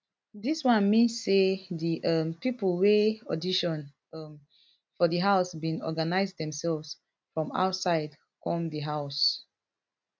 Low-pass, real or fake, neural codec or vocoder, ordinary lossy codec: none; real; none; none